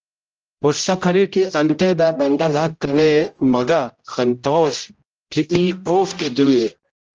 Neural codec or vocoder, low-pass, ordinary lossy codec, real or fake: codec, 16 kHz, 0.5 kbps, X-Codec, HuBERT features, trained on general audio; 7.2 kHz; Opus, 24 kbps; fake